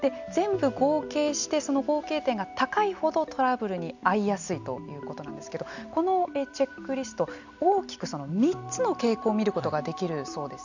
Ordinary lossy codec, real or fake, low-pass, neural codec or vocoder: none; real; 7.2 kHz; none